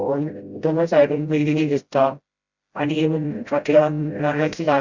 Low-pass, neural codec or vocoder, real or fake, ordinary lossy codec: 7.2 kHz; codec, 16 kHz, 0.5 kbps, FreqCodec, smaller model; fake; Opus, 64 kbps